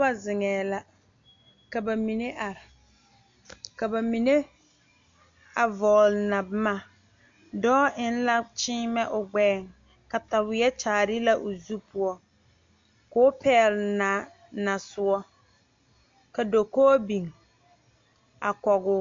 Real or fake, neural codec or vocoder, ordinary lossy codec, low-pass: real; none; MP3, 48 kbps; 7.2 kHz